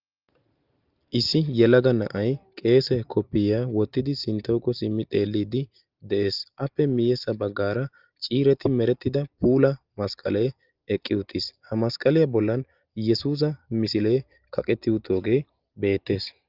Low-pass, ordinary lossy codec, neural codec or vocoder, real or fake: 5.4 kHz; Opus, 32 kbps; none; real